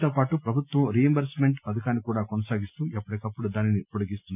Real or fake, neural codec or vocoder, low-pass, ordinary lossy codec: real; none; 3.6 kHz; none